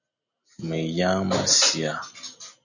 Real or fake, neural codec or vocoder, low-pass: real; none; 7.2 kHz